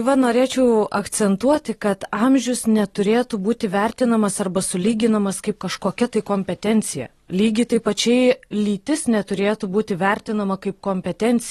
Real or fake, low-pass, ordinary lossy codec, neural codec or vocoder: real; 19.8 kHz; AAC, 32 kbps; none